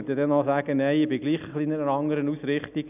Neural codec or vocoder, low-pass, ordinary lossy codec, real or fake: none; 3.6 kHz; none; real